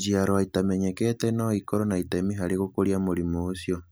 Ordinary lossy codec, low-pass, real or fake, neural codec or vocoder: none; none; real; none